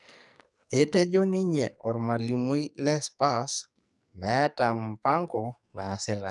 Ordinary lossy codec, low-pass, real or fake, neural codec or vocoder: none; 10.8 kHz; fake; codec, 44.1 kHz, 2.6 kbps, SNAC